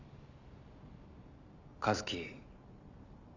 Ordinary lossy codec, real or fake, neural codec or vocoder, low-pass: none; real; none; 7.2 kHz